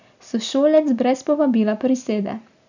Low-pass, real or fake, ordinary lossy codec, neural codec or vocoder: 7.2 kHz; real; none; none